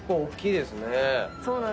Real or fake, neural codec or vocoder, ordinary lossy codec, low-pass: real; none; none; none